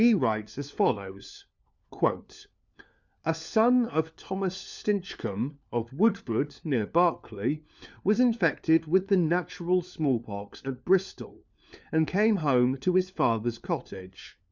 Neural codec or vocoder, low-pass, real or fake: codec, 16 kHz, 4 kbps, FunCodec, trained on LibriTTS, 50 frames a second; 7.2 kHz; fake